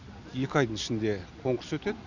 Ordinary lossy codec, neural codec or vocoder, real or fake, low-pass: none; none; real; 7.2 kHz